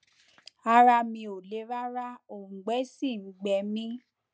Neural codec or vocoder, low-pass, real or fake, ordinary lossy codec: none; none; real; none